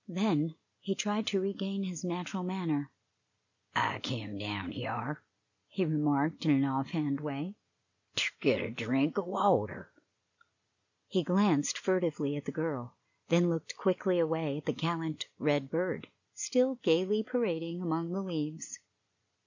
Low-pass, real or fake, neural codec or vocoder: 7.2 kHz; real; none